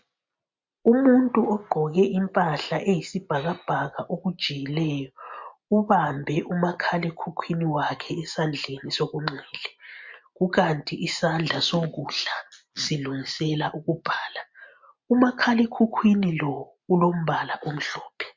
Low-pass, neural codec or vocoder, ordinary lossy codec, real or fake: 7.2 kHz; none; MP3, 48 kbps; real